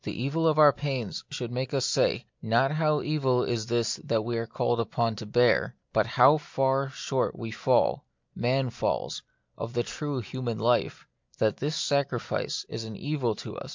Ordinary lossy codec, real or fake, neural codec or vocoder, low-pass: MP3, 64 kbps; real; none; 7.2 kHz